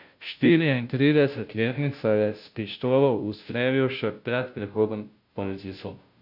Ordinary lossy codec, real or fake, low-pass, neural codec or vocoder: none; fake; 5.4 kHz; codec, 16 kHz, 0.5 kbps, FunCodec, trained on Chinese and English, 25 frames a second